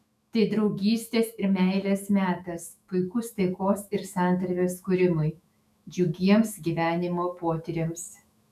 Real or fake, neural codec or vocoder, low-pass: fake; autoencoder, 48 kHz, 128 numbers a frame, DAC-VAE, trained on Japanese speech; 14.4 kHz